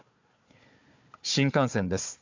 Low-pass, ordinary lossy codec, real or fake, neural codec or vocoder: 7.2 kHz; MP3, 64 kbps; fake; codec, 16 kHz, 4 kbps, FunCodec, trained on Chinese and English, 50 frames a second